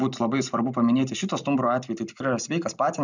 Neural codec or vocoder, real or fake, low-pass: none; real; 7.2 kHz